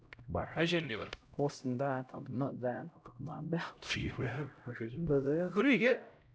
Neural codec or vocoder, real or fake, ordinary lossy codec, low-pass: codec, 16 kHz, 0.5 kbps, X-Codec, HuBERT features, trained on LibriSpeech; fake; none; none